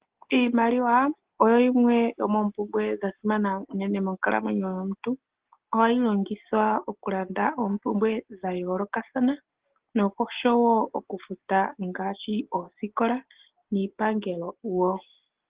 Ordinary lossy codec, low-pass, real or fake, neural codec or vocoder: Opus, 16 kbps; 3.6 kHz; real; none